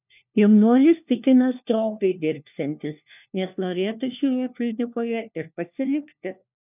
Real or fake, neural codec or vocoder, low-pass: fake; codec, 16 kHz, 1 kbps, FunCodec, trained on LibriTTS, 50 frames a second; 3.6 kHz